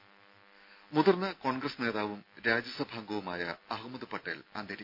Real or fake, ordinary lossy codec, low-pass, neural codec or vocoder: real; none; 5.4 kHz; none